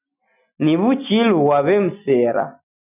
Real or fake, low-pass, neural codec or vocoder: real; 3.6 kHz; none